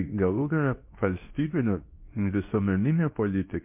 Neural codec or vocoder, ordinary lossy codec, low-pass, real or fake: codec, 24 kHz, 0.9 kbps, WavTokenizer, medium speech release version 2; MP3, 32 kbps; 3.6 kHz; fake